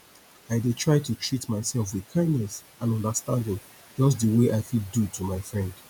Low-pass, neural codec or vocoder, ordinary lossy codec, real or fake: none; vocoder, 48 kHz, 128 mel bands, Vocos; none; fake